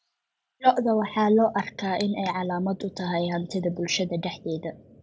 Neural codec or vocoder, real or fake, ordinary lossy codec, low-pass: none; real; none; none